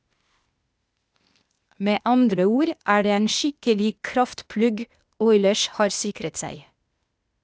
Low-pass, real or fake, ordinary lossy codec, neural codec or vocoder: none; fake; none; codec, 16 kHz, 0.8 kbps, ZipCodec